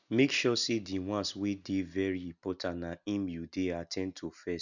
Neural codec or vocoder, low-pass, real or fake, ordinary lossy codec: none; 7.2 kHz; real; none